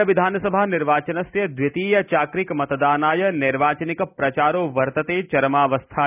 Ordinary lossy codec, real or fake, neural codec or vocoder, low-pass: none; real; none; 3.6 kHz